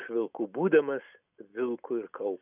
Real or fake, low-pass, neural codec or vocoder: real; 3.6 kHz; none